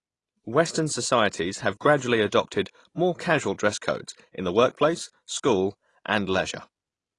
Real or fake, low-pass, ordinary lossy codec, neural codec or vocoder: real; 10.8 kHz; AAC, 32 kbps; none